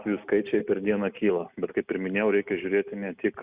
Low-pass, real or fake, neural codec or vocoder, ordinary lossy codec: 3.6 kHz; real; none; Opus, 64 kbps